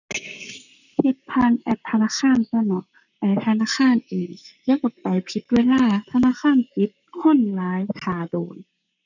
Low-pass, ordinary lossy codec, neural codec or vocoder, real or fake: 7.2 kHz; none; codec, 44.1 kHz, 7.8 kbps, Pupu-Codec; fake